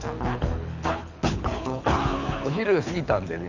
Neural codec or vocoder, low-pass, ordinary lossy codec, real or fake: codec, 24 kHz, 6 kbps, HILCodec; 7.2 kHz; none; fake